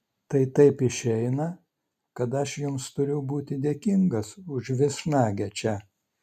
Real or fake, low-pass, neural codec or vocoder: real; 14.4 kHz; none